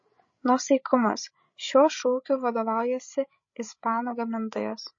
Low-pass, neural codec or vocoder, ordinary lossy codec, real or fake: 7.2 kHz; codec, 16 kHz, 16 kbps, FreqCodec, larger model; MP3, 32 kbps; fake